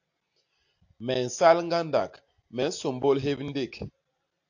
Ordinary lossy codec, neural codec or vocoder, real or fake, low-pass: AAC, 48 kbps; none; real; 7.2 kHz